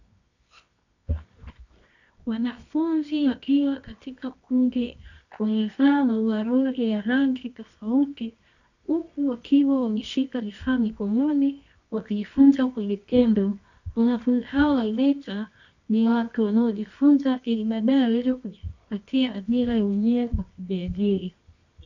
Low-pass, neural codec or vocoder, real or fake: 7.2 kHz; codec, 24 kHz, 0.9 kbps, WavTokenizer, medium music audio release; fake